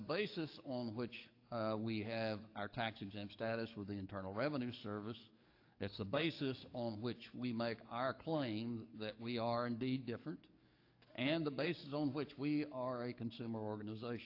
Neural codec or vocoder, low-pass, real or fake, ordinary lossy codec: codec, 44.1 kHz, 7.8 kbps, DAC; 5.4 kHz; fake; AAC, 32 kbps